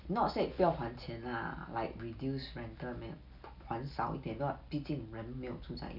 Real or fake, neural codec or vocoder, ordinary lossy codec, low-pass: real; none; none; 5.4 kHz